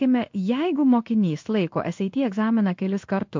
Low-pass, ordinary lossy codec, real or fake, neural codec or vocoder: 7.2 kHz; MP3, 48 kbps; fake; codec, 16 kHz in and 24 kHz out, 1 kbps, XY-Tokenizer